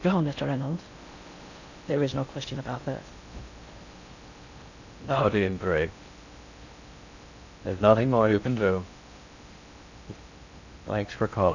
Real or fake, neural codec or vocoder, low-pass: fake; codec, 16 kHz in and 24 kHz out, 0.6 kbps, FocalCodec, streaming, 4096 codes; 7.2 kHz